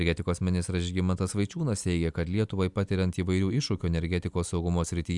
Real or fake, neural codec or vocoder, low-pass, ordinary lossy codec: real; none; 10.8 kHz; MP3, 96 kbps